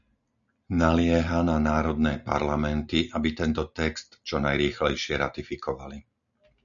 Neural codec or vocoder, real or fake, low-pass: none; real; 7.2 kHz